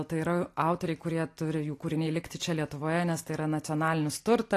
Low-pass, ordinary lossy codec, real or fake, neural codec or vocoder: 14.4 kHz; AAC, 48 kbps; real; none